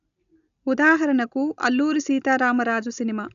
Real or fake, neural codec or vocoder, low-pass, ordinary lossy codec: real; none; 7.2 kHz; none